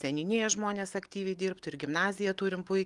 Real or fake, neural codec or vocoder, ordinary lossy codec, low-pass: real; none; Opus, 24 kbps; 10.8 kHz